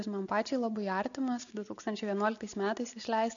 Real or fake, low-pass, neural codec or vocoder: real; 7.2 kHz; none